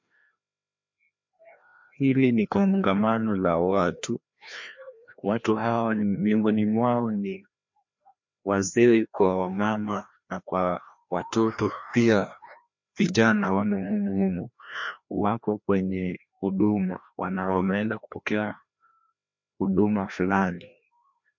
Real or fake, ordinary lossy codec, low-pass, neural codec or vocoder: fake; MP3, 48 kbps; 7.2 kHz; codec, 16 kHz, 1 kbps, FreqCodec, larger model